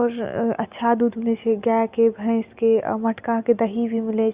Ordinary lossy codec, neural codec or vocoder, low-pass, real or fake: Opus, 64 kbps; none; 3.6 kHz; real